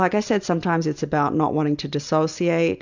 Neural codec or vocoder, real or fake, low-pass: none; real; 7.2 kHz